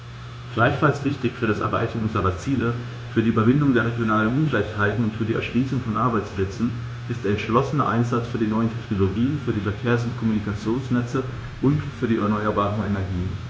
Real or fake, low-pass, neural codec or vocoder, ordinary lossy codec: fake; none; codec, 16 kHz, 0.9 kbps, LongCat-Audio-Codec; none